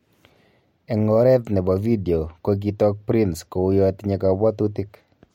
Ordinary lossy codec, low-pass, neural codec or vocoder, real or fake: MP3, 64 kbps; 19.8 kHz; none; real